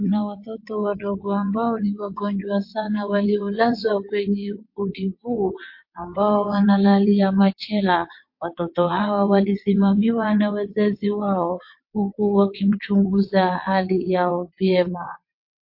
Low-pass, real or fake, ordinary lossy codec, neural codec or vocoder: 5.4 kHz; fake; MP3, 32 kbps; vocoder, 22.05 kHz, 80 mel bands, WaveNeXt